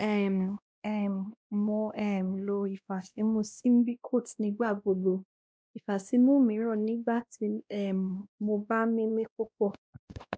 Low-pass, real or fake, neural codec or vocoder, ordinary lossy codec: none; fake; codec, 16 kHz, 1 kbps, X-Codec, WavLM features, trained on Multilingual LibriSpeech; none